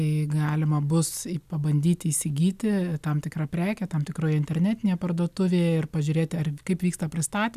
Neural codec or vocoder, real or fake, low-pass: none; real; 14.4 kHz